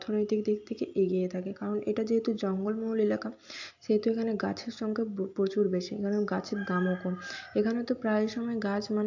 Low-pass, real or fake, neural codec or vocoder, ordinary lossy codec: 7.2 kHz; real; none; AAC, 48 kbps